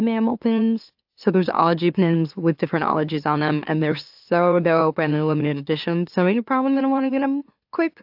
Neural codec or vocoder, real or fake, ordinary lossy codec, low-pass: autoencoder, 44.1 kHz, a latent of 192 numbers a frame, MeloTTS; fake; AAC, 48 kbps; 5.4 kHz